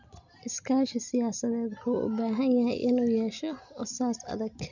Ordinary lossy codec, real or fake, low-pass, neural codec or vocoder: none; real; 7.2 kHz; none